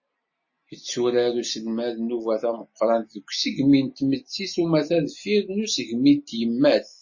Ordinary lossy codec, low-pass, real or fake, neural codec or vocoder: MP3, 32 kbps; 7.2 kHz; real; none